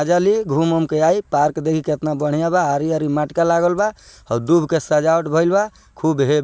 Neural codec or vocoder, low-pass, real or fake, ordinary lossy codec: none; none; real; none